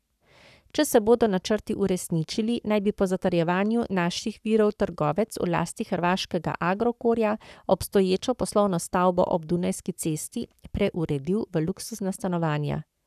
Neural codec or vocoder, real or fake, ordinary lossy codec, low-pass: codec, 44.1 kHz, 7.8 kbps, Pupu-Codec; fake; none; 14.4 kHz